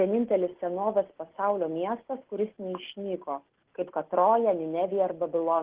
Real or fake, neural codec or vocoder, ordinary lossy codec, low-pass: real; none; Opus, 16 kbps; 3.6 kHz